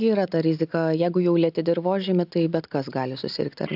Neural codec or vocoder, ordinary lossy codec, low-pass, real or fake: none; AAC, 48 kbps; 5.4 kHz; real